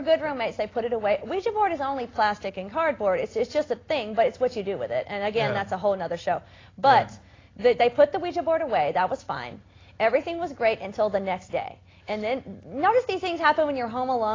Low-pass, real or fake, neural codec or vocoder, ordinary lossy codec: 7.2 kHz; real; none; AAC, 32 kbps